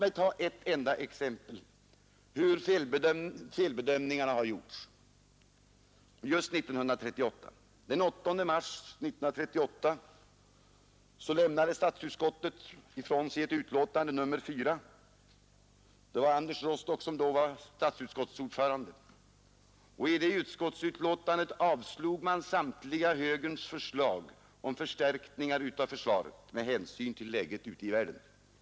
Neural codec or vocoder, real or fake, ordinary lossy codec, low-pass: none; real; none; none